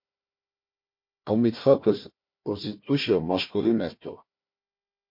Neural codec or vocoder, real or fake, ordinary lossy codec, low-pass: codec, 16 kHz, 1 kbps, FunCodec, trained on Chinese and English, 50 frames a second; fake; MP3, 32 kbps; 5.4 kHz